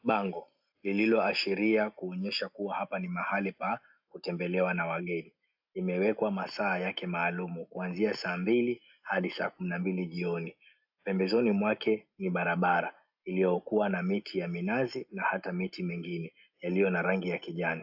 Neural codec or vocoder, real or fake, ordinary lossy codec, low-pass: none; real; AAC, 48 kbps; 5.4 kHz